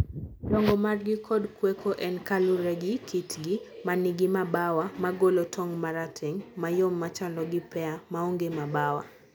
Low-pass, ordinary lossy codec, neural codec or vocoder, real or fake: none; none; none; real